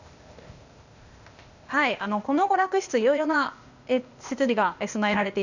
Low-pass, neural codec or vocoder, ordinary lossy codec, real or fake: 7.2 kHz; codec, 16 kHz, 0.8 kbps, ZipCodec; none; fake